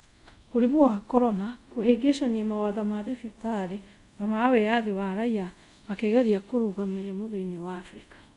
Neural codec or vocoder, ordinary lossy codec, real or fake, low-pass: codec, 24 kHz, 0.5 kbps, DualCodec; none; fake; 10.8 kHz